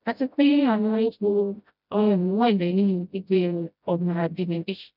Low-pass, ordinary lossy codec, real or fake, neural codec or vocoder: 5.4 kHz; none; fake; codec, 16 kHz, 0.5 kbps, FreqCodec, smaller model